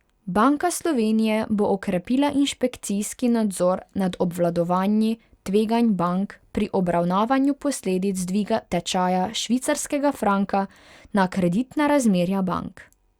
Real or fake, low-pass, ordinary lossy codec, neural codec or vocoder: real; 19.8 kHz; Opus, 64 kbps; none